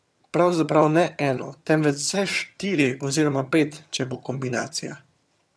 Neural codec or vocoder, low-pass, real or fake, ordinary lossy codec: vocoder, 22.05 kHz, 80 mel bands, HiFi-GAN; none; fake; none